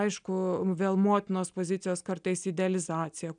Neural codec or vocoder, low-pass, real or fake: none; 9.9 kHz; real